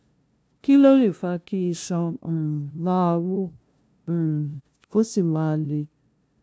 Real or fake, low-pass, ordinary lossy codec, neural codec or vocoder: fake; none; none; codec, 16 kHz, 0.5 kbps, FunCodec, trained on LibriTTS, 25 frames a second